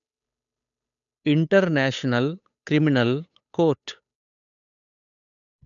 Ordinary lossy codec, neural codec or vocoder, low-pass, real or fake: none; codec, 16 kHz, 8 kbps, FunCodec, trained on Chinese and English, 25 frames a second; 7.2 kHz; fake